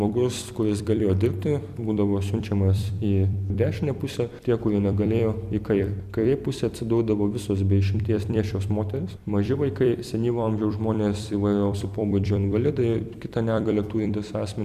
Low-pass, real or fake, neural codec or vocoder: 14.4 kHz; fake; vocoder, 44.1 kHz, 128 mel bands every 256 samples, BigVGAN v2